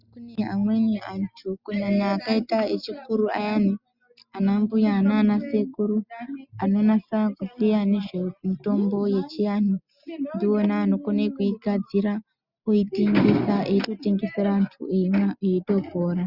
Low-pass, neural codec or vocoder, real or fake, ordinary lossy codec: 5.4 kHz; none; real; Opus, 64 kbps